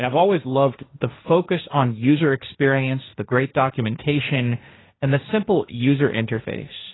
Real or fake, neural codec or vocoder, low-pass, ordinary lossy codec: fake; codec, 16 kHz, 1.1 kbps, Voila-Tokenizer; 7.2 kHz; AAC, 16 kbps